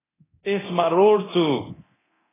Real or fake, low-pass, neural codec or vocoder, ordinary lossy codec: fake; 3.6 kHz; codec, 24 kHz, 0.9 kbps, DualCodec; AAC, 16 kbps